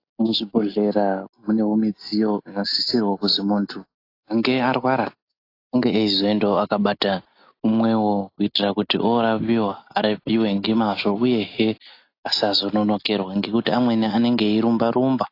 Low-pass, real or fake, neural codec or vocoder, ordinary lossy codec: 5.4 kHz; real; none; AAC, 32 kbps